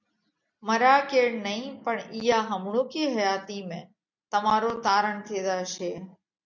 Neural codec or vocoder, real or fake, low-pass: none; real; 7.2 kHz